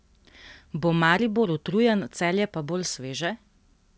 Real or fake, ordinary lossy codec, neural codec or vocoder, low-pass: real; none; none; none